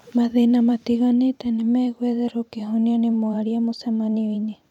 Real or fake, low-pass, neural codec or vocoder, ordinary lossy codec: fake; 19.8 kHz; vocoder, 44.1 kHz, 128 mel bands every 512 samples, BigVGAN v2; none